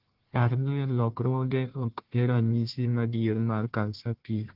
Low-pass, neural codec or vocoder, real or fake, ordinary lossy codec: 5.4 kHz; codec, 16 kHz, 1 kbps, FunCodec, trained on Chinese and English, 50 frames a second; fake; Opus, 16 kbps